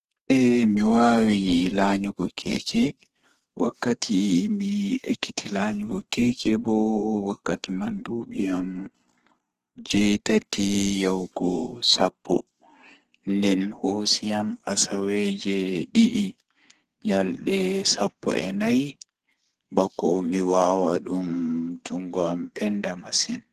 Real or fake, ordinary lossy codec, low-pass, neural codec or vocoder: fake; Opus, 16 kbps; 14.4 kHz; codec, 32 kHz, 1.9 kbps, SNAC